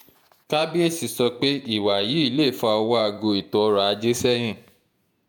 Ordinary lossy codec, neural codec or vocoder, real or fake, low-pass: none; vocoder, 48 kHz, 128 mel bands, Vocos; fake; none